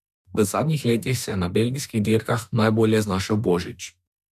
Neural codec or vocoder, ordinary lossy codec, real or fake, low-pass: autoencoder, 48 kHz, 32 numbers a frame, DAC-VAE, trained on Japanese speech; none; fake; 14.4 kHz